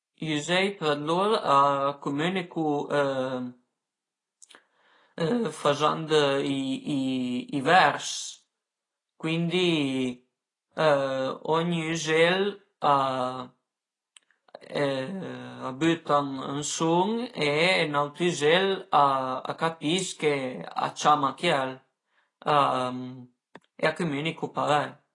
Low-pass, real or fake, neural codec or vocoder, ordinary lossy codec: 10.8 kHz; real; none; AAC, 32 kbps